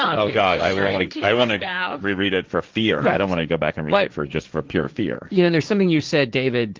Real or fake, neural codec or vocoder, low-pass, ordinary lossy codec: fake; codec, 16 kHz, 1.1 kbps, Voila-Tokenizer; 7.2 kHz; Opus, 32 kbps